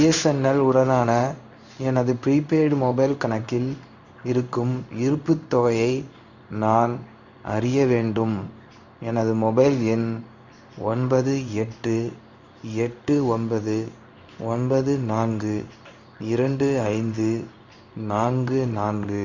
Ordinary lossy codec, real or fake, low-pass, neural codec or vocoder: none; fake; 7.2 kHz; codec, 16 kHz in and 24 kHz out, 1 kbps, XY-Tokenizer